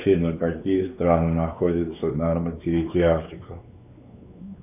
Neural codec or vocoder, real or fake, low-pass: codec, 16 kHz, 4 kbps, X-Codec, WavLM features, trained on Multilingual LibriSpeech; fake; 3.6 kHz